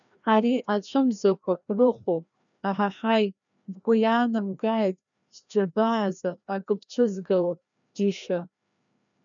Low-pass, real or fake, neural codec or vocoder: 7.2 kHz; fake; codec, 16 kHz, 1 kbps, FreqCodec, larger model